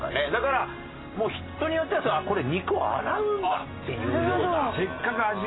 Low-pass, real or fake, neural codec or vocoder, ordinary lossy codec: 7.2 kHz; real; none; AAC, 16 kbps